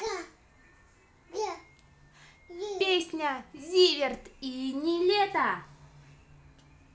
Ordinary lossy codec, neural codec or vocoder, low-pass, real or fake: none; none; none; real